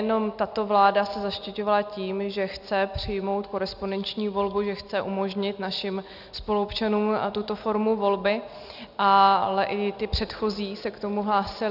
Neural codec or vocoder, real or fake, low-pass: none; real; 5.4 kHz